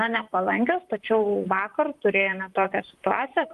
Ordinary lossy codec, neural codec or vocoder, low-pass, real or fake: Opus, 32 kbps; vocoder, 44.1 kHz, 128 mel bands, Pupu-Vocoder; 14.4 kHz; fake